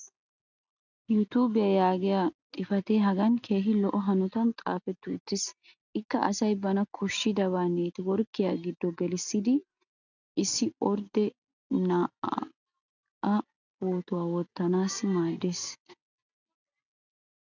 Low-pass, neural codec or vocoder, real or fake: 7.2 kHz; none; real